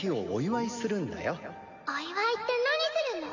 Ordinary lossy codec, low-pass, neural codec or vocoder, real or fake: none; 7.2 kHz; none; real